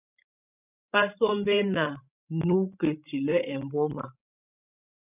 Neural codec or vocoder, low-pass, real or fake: codec, 16 kHz, 16 kbps, FreqCodec, larger model; 3.6 kHz; fake